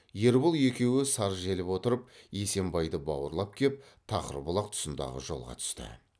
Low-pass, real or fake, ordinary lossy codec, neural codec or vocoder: none; real; none; none